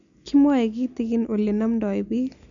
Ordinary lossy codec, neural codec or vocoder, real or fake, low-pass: none; none; real; 7.2 kHz